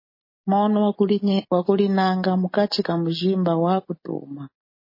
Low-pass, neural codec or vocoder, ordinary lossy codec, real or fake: 5.4 kHz; none; MP3, 24 kbps; real